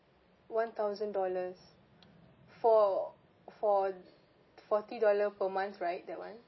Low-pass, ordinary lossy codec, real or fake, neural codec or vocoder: 7.2 kHz; MP3, 24 kbps; real; none